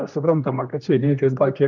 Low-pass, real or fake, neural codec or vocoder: 7.2 kHz; fake; codec, 16 kHz, 1 kbps, X-Codec, HuBERT features, trained on general audio